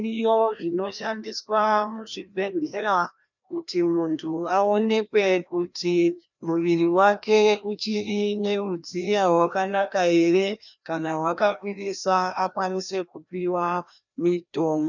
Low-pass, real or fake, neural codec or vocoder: 7.2 kHz; fake; codec, 16 kHz, 1 kbps, FreqCodec, larger model